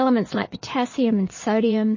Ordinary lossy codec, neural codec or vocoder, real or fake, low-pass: MP3, 32 kbps; vocoder, 22.05 kHz, 80 mel bands, Vocos; fake; 7.2 kHz